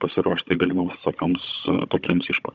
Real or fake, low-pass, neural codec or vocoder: fake; 7.2 kHz; codec, 16 kHz, 16 kbps, FunCodec, trained on Chinese and English, 50 frames a second